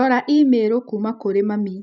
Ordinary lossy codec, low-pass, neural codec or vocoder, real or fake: none; 7.2 kHz; none; real